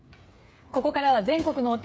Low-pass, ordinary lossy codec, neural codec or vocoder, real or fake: none; none; codec, 16 kHz, 8 kbps, FreqCodec, smaller model; fake